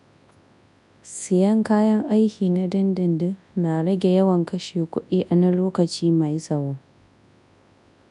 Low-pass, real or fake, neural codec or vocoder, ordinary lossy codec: 10.8 kHz; fake; codec, 24 kHz, 0.9 kbps, WavTokenizer, large speech release; MP3, 96 kbps